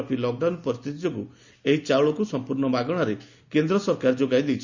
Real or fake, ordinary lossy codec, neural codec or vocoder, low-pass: real; Opus, 64 kbps; none; 7.2 kHz